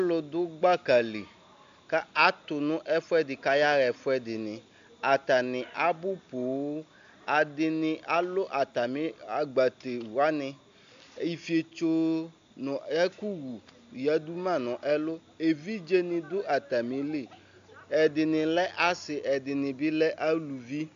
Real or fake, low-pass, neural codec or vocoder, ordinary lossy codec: real; 7.2 kHz; none; AAC, 64 kbps